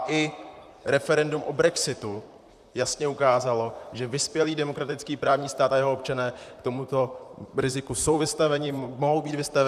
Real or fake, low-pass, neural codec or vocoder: fake; 14.4 kHz; vocoder, 44.1 kHz, 128 mel bands, Pupu-Vocoder